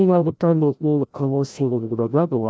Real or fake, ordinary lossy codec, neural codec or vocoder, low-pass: fake; none; codec, 16 kHz, 0.5 kbps, FreqCodec, larger model; none